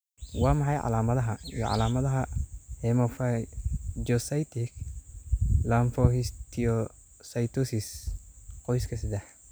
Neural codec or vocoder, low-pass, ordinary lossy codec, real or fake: none; none; none; real